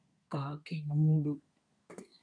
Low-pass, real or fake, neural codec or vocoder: 9.9 kHz; fake; codec, 24 kHz, 1 kbps, SNAC